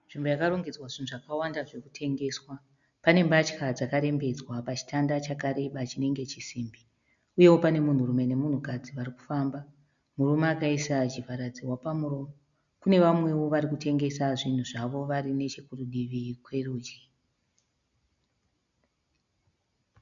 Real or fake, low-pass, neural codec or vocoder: real; 7.2 kHz; none